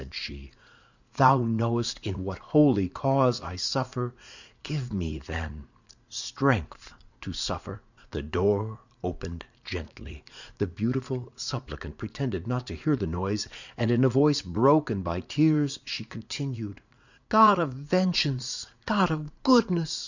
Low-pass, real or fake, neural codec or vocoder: 7.2 kHz; fake; vocoder, 44.1 kHz, 80 mel bands, Vocos